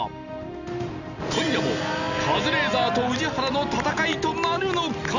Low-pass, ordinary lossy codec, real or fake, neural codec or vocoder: 7.2 kHz; none; real; none